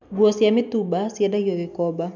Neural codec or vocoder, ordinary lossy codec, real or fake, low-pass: none; none; real; 7.2 kHz